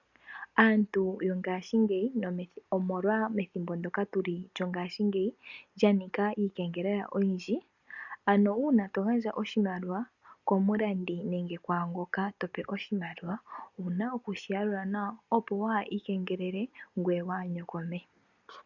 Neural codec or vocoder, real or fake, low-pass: none; real; 7.2 kHz